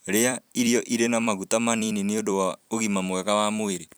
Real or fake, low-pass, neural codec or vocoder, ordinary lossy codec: fake; none; vocoder, 44.1 kHz, 128 mel bands every 256 samples, BigVGAN v2; none